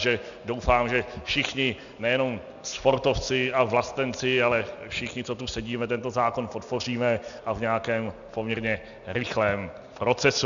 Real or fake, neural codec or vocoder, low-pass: real; none; 7.2 kHz